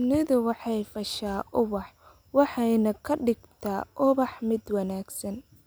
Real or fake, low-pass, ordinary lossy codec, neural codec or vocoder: real; none; none; none